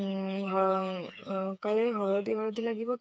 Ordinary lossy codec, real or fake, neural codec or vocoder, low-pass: none; fake; codec, 16 kHz, 4 kbps, FreqCodec, smaller model; none